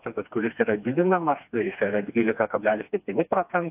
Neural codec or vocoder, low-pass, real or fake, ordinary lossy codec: codec, 16 kHz, 2 kbps, FreqCodec, smaller model; 3.6 kHz; fake; AAC, 32 kbps